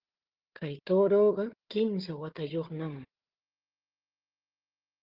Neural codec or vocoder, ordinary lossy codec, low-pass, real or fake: codec, 16 kHz, 4 kbps, FunCodec, trained on Chinese and English, 50 frames a second; Opus, 16 kbps; 5.4 kHz; fake